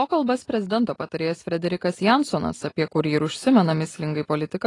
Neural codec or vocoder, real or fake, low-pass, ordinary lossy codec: none; real; 10.8 kHz; AAC, 32 kbps